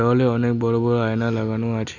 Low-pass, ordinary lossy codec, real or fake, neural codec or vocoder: 7.2 kHz; Opus, 64 kbps; real; none